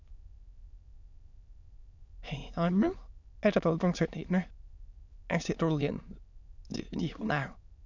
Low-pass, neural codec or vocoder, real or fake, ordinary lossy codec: 7.2 kHz; autoencoder, 22.05 kHz, a latent of 192 numbers a frame, VITS, trained on many speakers; fake; none